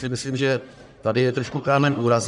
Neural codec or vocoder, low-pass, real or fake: codec, 44.1 kHz, 1.7 kbps, Pupu-Codec; 10.8 kHz; fake